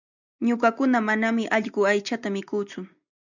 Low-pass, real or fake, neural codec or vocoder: 7.2 kHz; real; none